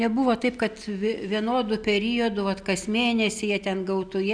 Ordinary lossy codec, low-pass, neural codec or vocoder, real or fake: MP3, 96 kbps; 9.9 kHz; none; real